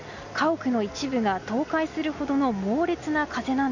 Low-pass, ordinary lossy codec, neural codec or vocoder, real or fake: 7.2 kHz; none; none; real